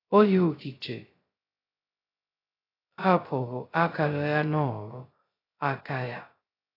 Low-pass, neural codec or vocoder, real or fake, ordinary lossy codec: 5.4 kHz; codec, 16 kHz, 0.2 kbps, FocalCodec; fake; AAC, 24 kbps